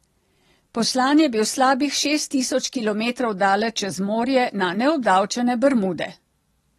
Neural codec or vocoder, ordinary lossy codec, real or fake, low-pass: none; AAC, 32 kbps; real; 19.8 kHz